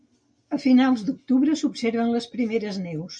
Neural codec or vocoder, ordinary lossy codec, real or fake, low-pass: none; MP3, 64 kbps; real; 9.9 kHz